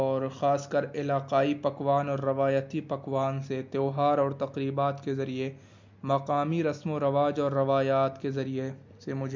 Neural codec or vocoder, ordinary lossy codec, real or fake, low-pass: none; MP3, 64 kbps; real; 7.2 kHz